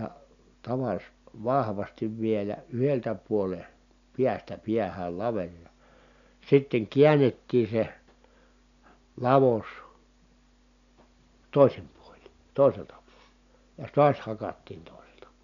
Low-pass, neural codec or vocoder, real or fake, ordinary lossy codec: 7.2 kHz; none; real; none